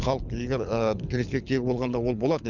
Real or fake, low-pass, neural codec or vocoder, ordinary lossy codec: fake; 7.2 kHz; codec, 24 kHz, 6 kbps, HILCodec; none